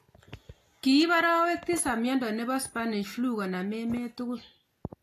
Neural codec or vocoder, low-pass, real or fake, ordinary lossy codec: none; 14.4 kHz; real; AAC, 48 kbps